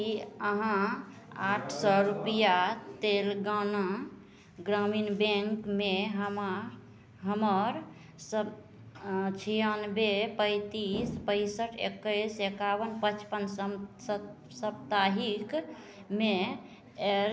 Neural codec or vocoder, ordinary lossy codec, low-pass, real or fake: none; none; none; real